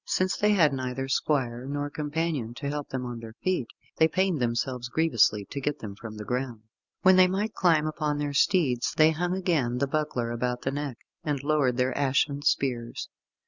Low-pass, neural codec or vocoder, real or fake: 7.2 kHz; none; real